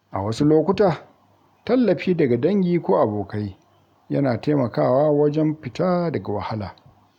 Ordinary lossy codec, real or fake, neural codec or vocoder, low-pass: none; real; none; 19.8 kHz